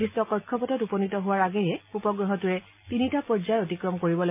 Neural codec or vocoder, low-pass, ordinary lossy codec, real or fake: none; 3.6 kHz; MP3, 24 kbps; real